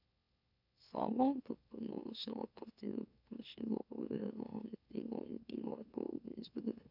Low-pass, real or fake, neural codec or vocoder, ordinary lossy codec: 5.4 kHz; fake; autoencoder, 44.1 kHz, a latent of 192 numbers a frame, MeloTTS; none